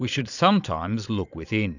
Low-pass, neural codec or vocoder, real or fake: 7.2 kHz; vocoder, 22.05 kHz, 80 mel bands, Vocos; fake